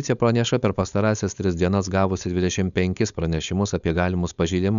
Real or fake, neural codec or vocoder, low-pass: fake; codec, 16 kHz, 4.8 kbps, FACodec; 7.2 kHz